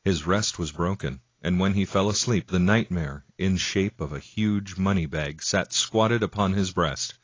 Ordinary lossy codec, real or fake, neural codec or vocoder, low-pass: AAC, 32 kbps; real; none; 7.2 kHz